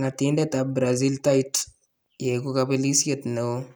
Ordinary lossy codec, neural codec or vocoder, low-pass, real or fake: none; none; none; real